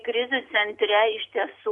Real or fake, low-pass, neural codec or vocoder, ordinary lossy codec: real; 10.8 kHz; none; MP3, 64 kbps